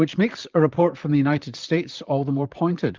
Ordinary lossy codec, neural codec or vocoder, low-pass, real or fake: Opus, 24 kbps; vocoder, 44.1 kHz, 128 mel bands, Pupu-Vocoder; 7.2 kHz; fake